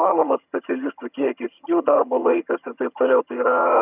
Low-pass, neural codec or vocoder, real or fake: 3.6 kHz; vocoder, 22.05 kHz, 80 mel bands, HiFi-GAN; fake